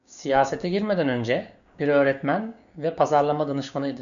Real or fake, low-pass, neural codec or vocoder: fake; 7.2 kHz; codec, 16 kHz, 6 kbps, DAC